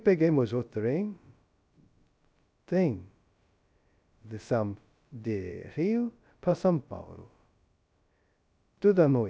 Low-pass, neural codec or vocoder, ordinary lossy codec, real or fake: none; codec, 16 kHz, 0.2 kbps, FocalCodec; none; fake